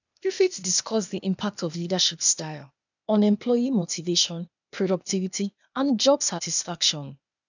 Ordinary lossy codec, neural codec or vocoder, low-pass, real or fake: none; codec, 16 kHz, 0.8 kbps, ZipCodec; 7.2 kHz; fake